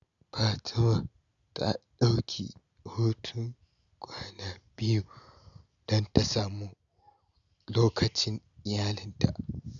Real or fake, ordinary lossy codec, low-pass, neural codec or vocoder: real; none; 7.2 kHz; none